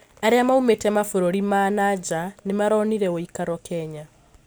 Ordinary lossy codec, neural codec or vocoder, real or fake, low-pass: none; none; real; none